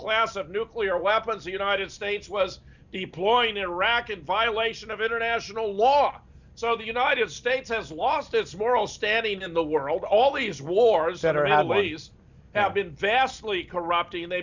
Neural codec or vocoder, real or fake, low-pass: none; real; 7.2 kHz